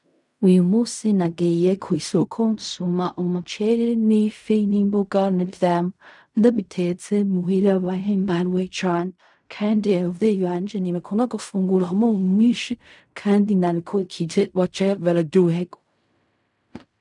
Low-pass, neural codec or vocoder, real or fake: 10.8 kHz; codec, 16 kHz in and 24 kHz out, 0.4 kbps, LongCat-Audio-Codec, fine tuned four codebook decoder; fake